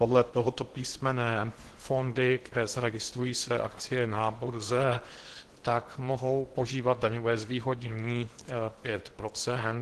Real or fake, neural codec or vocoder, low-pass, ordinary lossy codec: fake; codec, 16 kHz in and 24 kHz out, 0.8 kbps, FocalCodec, streaming, 65536 codes; 10.8 kHz; Opus, 16 kbps